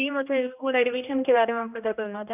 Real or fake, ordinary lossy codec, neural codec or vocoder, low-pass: fake; none; codec, 16 kHz, 1 kbps, X-Codec, HuBERT features, trained on general audio; 3.6 kHz